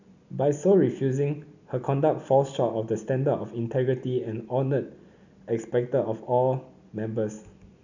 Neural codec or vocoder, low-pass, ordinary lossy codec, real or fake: none; 7.2 kHz; none; real